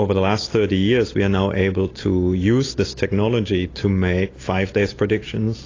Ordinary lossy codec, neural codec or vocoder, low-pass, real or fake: AAC, 32 kbps; none; 7.2 kHz; real